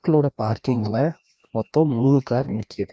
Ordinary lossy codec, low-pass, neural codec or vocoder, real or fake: none; none; codec, 16 kHz, 1 kbps, FreqCodec, larger model; fake